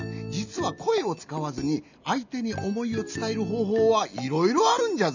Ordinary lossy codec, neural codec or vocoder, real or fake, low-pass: none; none; real; 7.2 kHz